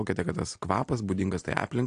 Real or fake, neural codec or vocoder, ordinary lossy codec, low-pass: real; none; AAC, 48 kbps; 9.9 kHz